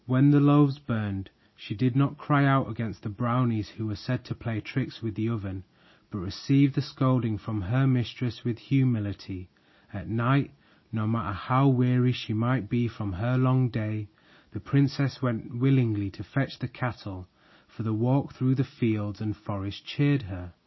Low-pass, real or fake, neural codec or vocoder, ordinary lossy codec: 7.2 kHz; real; none; MP3, 24 kbps